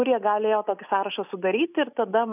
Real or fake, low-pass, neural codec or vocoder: real; 3.6 kHz; none